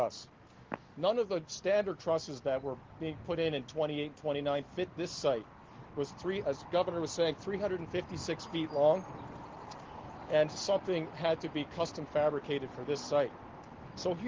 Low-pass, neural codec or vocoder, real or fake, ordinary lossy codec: 7.2 kHz; none; real; Opus, 16 kbps